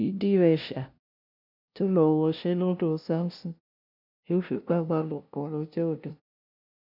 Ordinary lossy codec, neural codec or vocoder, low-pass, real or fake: none; codec, 16 kHz, 0.5 kbps, FunCodec, trained on LibriTTS, 25 frames a second; 5.4 kHz; fake